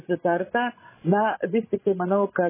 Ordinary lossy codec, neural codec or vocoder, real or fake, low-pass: MP3, 16 kbps; codec, 16 kHz, 16 kbps, FreqCodec, larger model; fake; 3.6 kHz